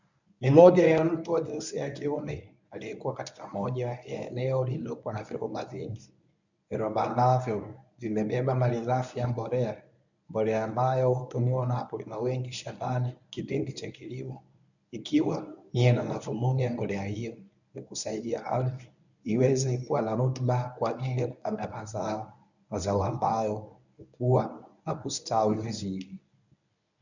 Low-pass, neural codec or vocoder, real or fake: 7.2 kHz; codec, 24 kHz, 0.9 kbps, WavTokenizer, medium speech release version 1; fake